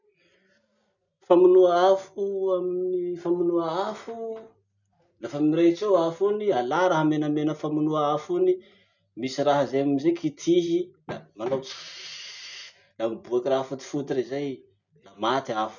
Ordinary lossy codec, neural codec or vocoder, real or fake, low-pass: none; none; real; 7.2 kHz